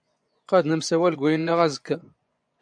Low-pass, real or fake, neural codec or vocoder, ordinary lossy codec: 9.9 kHz; fake; vocoder, 24 kHz, 100 mel bands, Vocos; MP3, 96 kbps